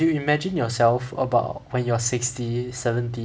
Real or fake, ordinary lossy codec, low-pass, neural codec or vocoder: real; none; none; none